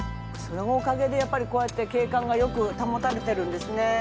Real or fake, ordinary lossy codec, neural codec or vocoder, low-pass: real; none; none; none